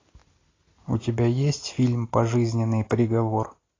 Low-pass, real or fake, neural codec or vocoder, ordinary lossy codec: 7.2 kHz; real; none; AAC, 32 kbps